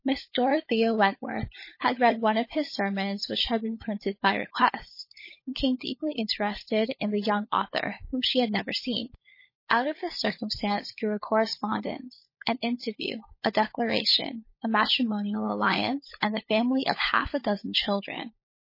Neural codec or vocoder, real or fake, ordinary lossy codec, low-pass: codec, 16 kHz, 16 kbps, FunCodec, trained on LibriTTS, 50 frames a second; fake; MP3, 24 kbps; 5.4 kHz